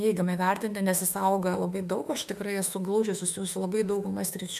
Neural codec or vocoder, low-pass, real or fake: autoencoder, 48 kHz, 32 numbers a frame, DAC-VAE, trained on Japanese speech; 14.4 kHz; fake